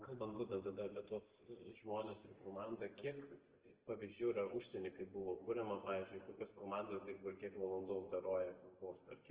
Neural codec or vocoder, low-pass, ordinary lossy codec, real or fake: none; 3.6 kHz; Opus, 16 kbps; real